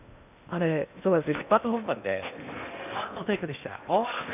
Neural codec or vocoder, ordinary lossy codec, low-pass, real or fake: codec, 16 kHz in and 24 kHz out, 0.8 kbps, FocalCodec, streaming, 65536 codes; none; 3.6 kHz; fake